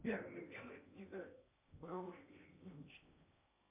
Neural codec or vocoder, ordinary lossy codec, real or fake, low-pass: codec, 16 kHz in and 24 kHz out, 0.8 kbps, FocalCodec, streaming, 65536 codes; MP3, 24 kbps; fake; 3.6 kHz